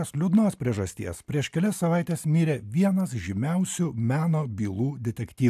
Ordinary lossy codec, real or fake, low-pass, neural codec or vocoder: AAC, 96 kbps; real; 14.4 kHz; none